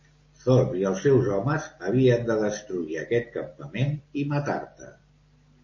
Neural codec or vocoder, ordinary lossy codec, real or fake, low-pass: none; MP3, 32 kbps; real; 7.2 kHz